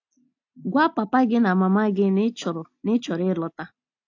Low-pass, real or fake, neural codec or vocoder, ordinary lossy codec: 7.2 kHz; real; none; AAC, 48 kbps